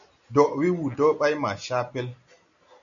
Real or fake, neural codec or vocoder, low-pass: real; none; 7.2 kHz